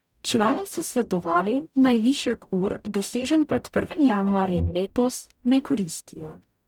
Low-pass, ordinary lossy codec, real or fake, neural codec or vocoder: 19.8 kHz; none; fake; codec, 44.1 kHz, 0.9 kbps, DAC